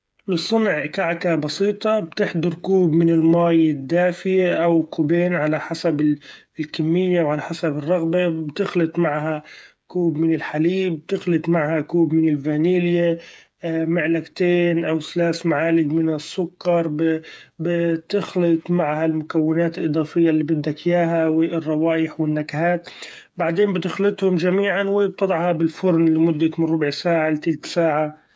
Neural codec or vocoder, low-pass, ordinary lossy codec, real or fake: codec, 16 kHz, 8 kbps, FreqCodec, smaller model; none; none; fake